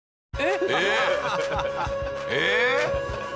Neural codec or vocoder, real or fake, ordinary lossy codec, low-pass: none; real; none; none